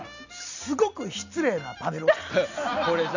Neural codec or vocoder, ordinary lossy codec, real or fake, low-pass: none; none; real; 7.2 kHz